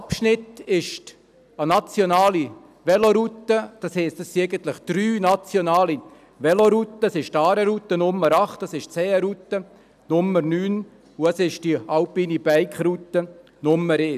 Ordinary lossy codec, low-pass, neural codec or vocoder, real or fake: none; 14.4 kHz; none; real